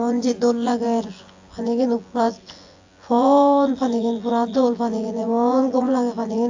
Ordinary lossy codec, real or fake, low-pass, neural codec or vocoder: AAC, 48 kbps; fake; 7.2 kHz; vocoder, 24 kHz, 100 mel bands, Vocos